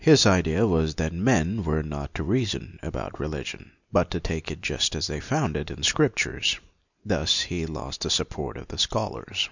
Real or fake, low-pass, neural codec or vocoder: real; 7.2 kHz; none